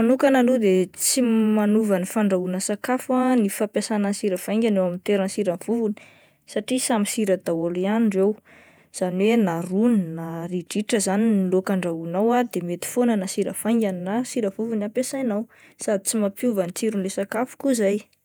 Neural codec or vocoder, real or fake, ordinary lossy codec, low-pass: vocoder, 48 kHz, 128 mel bands, Vocos; fake; none; none